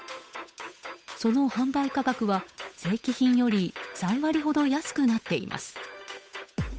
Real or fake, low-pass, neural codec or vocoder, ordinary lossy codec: fake; none; codec, 16 kHz, 8 kbps, FunCodec, trained on Chinese and English, 25 frames a second; none